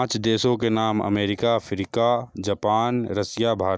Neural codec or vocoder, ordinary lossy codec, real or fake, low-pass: none; none; real; none